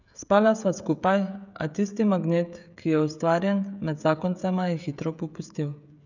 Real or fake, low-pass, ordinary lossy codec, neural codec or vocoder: fake; 7.2 kHz; none; codec, 16 kHz, 16 kbps, FreqCodec, smaller model